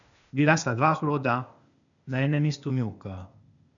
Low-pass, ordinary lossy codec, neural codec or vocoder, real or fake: 7.2 kHz; none; codec, 16 kHz, 0.8 kbps, ZipCodec; fake